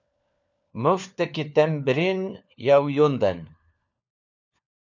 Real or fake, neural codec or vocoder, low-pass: fake; codec, 16 kHz, 4 kbps, FunCodec, trained on LibriTTS, 50 frames a second; 7.2 kHz